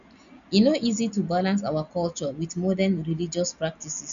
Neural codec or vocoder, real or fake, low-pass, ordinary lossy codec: none; real; 7.2 kHz; none